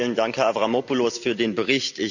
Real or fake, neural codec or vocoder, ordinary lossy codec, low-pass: real; none; none; 7.2 kHz